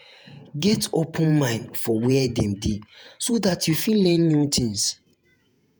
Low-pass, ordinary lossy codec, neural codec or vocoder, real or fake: none; none; vocoder, 48 kHz, 128 mel bands, Vocos; fake